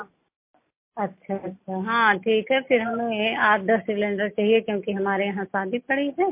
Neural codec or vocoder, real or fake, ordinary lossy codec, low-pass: none; real; MP3, 24 kbps; 3.6 kHz